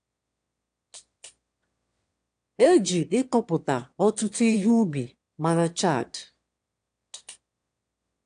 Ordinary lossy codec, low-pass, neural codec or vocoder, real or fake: AAC, 64 kbps; 9.9 kHz; autoencoder, 22.05 kHz, a latent of 192 numbers a frame, VITS, trained on one speaker; fake